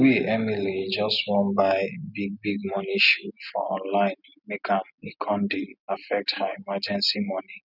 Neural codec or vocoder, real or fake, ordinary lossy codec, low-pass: none; real; none; 5.4 kHz